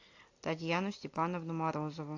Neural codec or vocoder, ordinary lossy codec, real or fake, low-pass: none; AAC, 48 kbps; real; 7.2 kHz